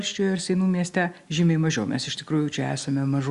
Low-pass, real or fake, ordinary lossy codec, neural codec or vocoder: 10.8 kHz; real; Opus, 64 kbps; none